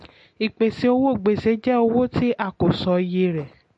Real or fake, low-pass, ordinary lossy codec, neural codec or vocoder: real; 10.8 kHz; MP3, 48 kbps; none